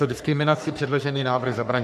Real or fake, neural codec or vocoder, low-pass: fake; codec, 44.1 kHz, 3.4 kbps, Pupu-Codec; 14.4 kHz